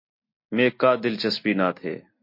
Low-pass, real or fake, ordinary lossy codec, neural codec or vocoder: 5.4 kHz; real; MP3, 32 kbps; none